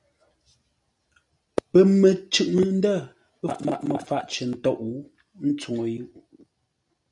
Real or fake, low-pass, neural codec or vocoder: real; 10.8 kHz; none